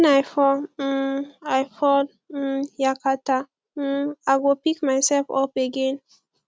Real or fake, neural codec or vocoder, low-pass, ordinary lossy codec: real; none; none; none